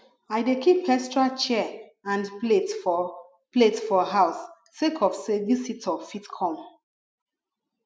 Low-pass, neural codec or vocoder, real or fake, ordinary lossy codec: none; none; real; none